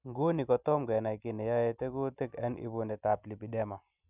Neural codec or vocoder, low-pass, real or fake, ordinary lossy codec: none; 3.6 kHz; real; none